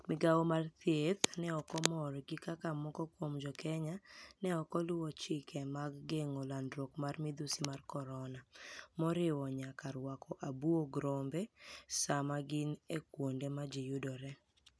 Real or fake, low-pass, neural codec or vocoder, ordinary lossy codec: real; none; none; none